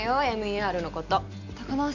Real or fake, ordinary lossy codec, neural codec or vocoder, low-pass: real; none; none; 7.2 kHz